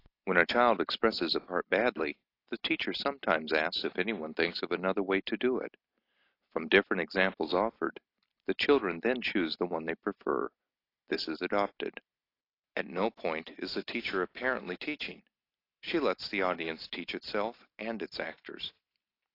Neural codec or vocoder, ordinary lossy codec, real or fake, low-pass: none; AAC, 32 kbps; real; 5.4 kHz